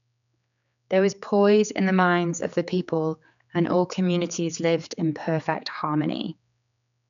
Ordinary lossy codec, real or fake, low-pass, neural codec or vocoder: none; fake; 7.2 kHz; codec, 16 kHz, 4 kbps, X-Codec, HuBERT features, trained on general audio